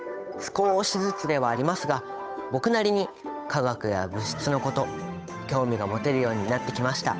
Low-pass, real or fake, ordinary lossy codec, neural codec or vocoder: none; fake; none; codec, 16 kHz, 8 kbps, FunCodec, trained on Chinese and English, 25 frames a second